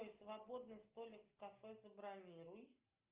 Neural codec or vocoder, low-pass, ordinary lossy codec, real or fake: none; 3.6 kHz; Opus, 32 kbps; real